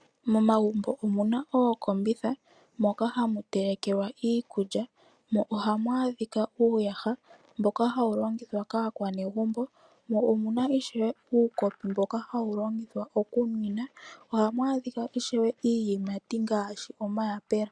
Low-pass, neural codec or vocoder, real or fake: 9.9 kHz; none; real